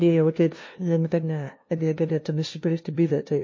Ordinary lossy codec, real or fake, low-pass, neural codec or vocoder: MP3, 32 kbps; fake; 7.2 kHz; codec, 16 kHz, 0.5 kbps, FunCodec, trained on LibriTTS, 25 frames a second